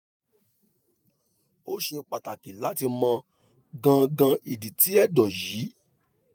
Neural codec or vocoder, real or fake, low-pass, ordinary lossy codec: vocoder, 48 kHz, 128 mel bands, Vocos; fake; none; none